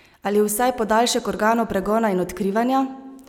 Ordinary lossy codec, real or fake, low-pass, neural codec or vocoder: none; real; 19.8 kHz; none